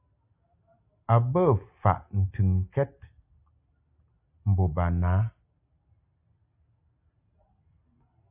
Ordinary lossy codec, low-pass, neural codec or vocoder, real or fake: AAC, 32 kbps; 3.6 kHz; none; real